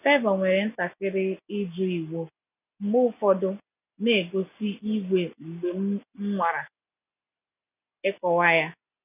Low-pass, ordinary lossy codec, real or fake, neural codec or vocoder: 3.6 kHz; none; real; none